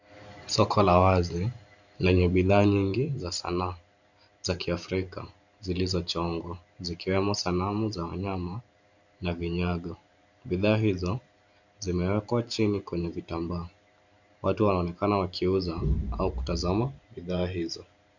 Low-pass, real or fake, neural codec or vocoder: 7.2 kHz; fake; vocoder, 44.1 kHz, 128 mel bands every 512 samples, BigVGAN v2